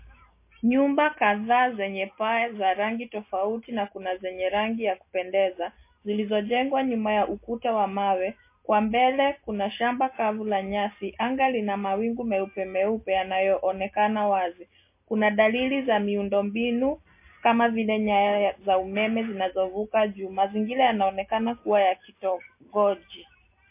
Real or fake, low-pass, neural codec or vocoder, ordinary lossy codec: real; 3.6 kHz; none; MP3, 24 kbps